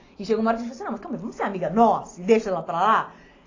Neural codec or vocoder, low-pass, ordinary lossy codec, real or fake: none; 7.2 kHz; AAC, 32 kbps; real